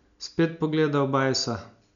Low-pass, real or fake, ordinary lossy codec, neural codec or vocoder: 7.2 kHz; real; none; none